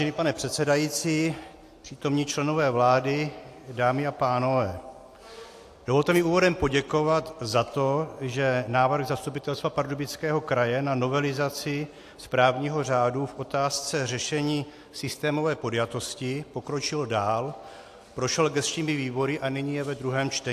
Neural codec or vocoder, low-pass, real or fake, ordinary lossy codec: none; 14.4 kHz; real; AAC, 64 kbps